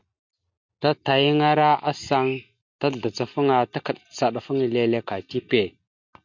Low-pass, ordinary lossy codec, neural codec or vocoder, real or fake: 7.2 kHz; MP3, 64 kbps; none; real